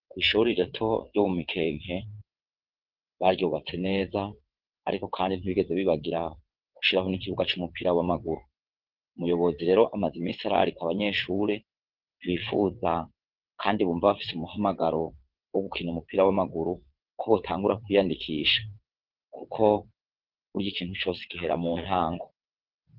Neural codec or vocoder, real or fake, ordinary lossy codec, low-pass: vocoder, 22.05 kHz, 80 mel bands, WaveNeXt; fake; Opus, 16 kbps; 5.4 kHz